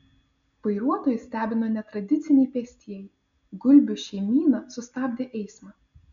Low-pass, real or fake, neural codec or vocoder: 7.2 kHz; real; none